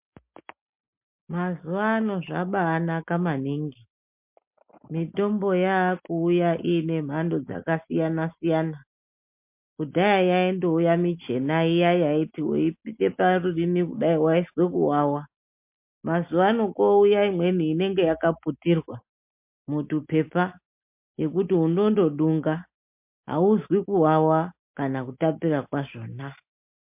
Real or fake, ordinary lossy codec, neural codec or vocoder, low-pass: real; MP3, 32 kbps; none; 3.6 kHz